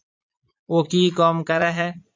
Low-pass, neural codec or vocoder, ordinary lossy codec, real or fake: 7.2 kHz; vocoder, 22.05 kHz, 80 mel bands, Vocos; MP3, 48 kbps; fake